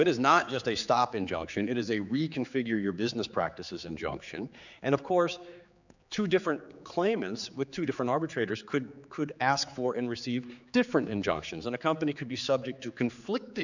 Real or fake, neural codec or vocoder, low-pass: fake; codec, 16 kHz, 4 kbps, X-Codec, HuBERT features, trained on general audio; 7.2 kHz